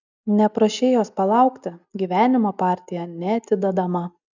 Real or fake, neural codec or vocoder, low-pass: real; none; 7.2 kHz